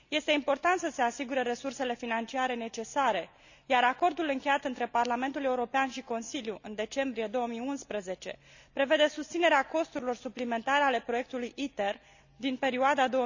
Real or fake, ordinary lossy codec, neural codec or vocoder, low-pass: real; none; none; 7.2 kHz